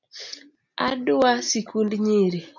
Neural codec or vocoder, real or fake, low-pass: none; real; 7.2 kHz